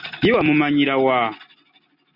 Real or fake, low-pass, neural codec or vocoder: real; 5.4 kHz; none